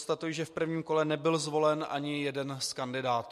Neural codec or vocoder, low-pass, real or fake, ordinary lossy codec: none; 14.4 kHz; real; MP3, 64 kbps